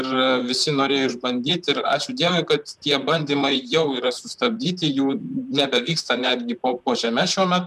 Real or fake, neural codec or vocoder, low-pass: fake; vocoder, 44.1 kHz, 128 mel bands, Pupu-Vocoder; 14.4 kHz